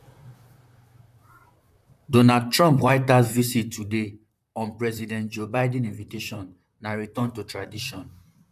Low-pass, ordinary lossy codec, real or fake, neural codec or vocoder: 14.4 kHz; none; fake; vocoder, 44.1 kHz, 128 mel bands, Pupu-Vocoder